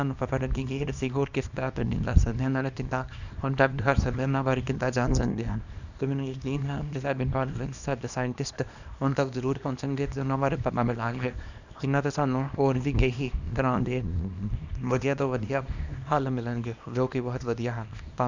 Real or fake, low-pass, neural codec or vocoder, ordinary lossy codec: fake; 7.2 kHz; codec, 24 kHz, 0.9 kbps, WavTokenizer, small release; none